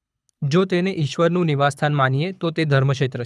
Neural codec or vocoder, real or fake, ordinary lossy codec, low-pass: codec, 24 kHz, 6 kbps, HILCodec; fake; none; none